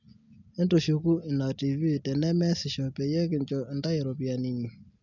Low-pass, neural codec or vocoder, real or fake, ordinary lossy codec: 7.2 kHz; none; real; none